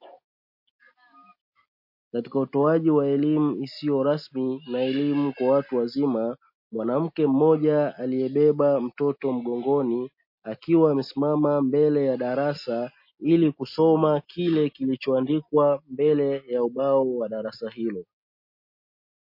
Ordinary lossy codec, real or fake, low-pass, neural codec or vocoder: MP3, 32 kbps; real; 5.4 kHz; none